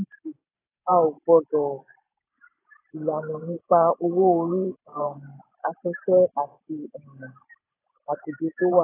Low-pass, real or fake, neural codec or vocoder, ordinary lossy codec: 3.6 kHz; fake; vocoder, 44.1 kHz, 128 mel bands every 512 samples, BigVGAN v2; AAC, 16 kbps